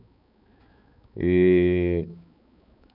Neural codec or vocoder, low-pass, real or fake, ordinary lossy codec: codec, 16 kHz, 4 kbps, X-Codec, HuBERT features, trained on balanced general audio; 5.4 kHz; fake; none